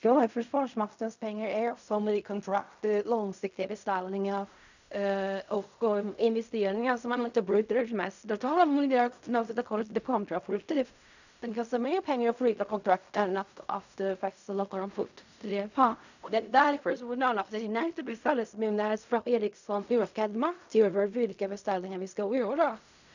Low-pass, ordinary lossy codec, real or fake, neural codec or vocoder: 7.2 kHz; none; fake; codec, 16 kHz in and 24 kHz out, 0.4 kbps, LongCat-Audio-Codec, fine tuned four codebook decoder